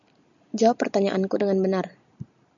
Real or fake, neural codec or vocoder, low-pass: real; none; 7.2 kHz